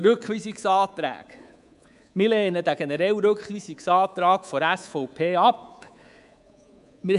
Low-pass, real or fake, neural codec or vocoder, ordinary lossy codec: 10.8 kHz; fake; codec, 24 kHz, 3.1 kbps, DualCodec; none